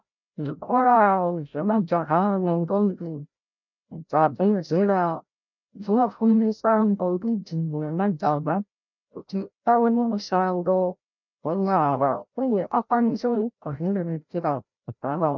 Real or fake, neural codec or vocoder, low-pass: fake; codec, 16 kHz, 0.5 kbps, FreqCodec, larger model; 7.2 kHz